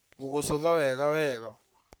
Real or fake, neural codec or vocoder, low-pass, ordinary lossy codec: fake; codec, 44.1 kHz, 3.4 kbps, Pupu-Codec; none; none